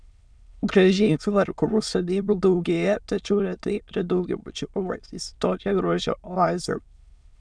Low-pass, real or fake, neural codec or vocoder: 9.9 kHz; fake; autoencoder, 22.05 kHz, a latent of 192 numbers a frame, VITS, trained on many speakers